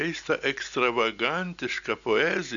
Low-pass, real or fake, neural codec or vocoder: 7.2 kHz; real; none